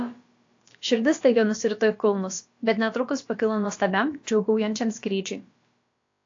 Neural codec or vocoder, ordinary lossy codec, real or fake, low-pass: codec, 16 kHz, about 1 kbps, DyCAST, with the encoder's durations; AAC, 48 kbps; fake; 7.2 kHz